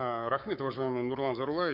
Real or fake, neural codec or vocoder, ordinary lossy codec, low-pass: fake; codec, 44.1 kHz, 7.8 kbps, Pupu-Codec; none; 5.4 kHz